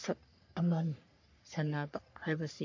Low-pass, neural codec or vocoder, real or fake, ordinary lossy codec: 7.2 kHz; codec, 44.1 kHz, 3.4 kbps, Pupu-Codec; fake; MP3, 48 kbps